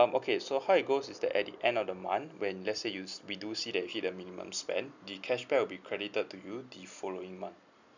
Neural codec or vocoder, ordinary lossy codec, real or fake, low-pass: none; none; real; 7.2 kHz